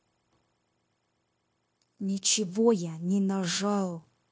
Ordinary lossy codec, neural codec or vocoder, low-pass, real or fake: none; codec, 16 kHz, 0.9 kbps, LongCat-Audio-Codec; none; fake